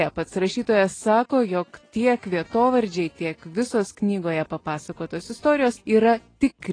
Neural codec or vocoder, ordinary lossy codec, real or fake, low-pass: none; AAC, 32 kbps; real; 9.9 kHz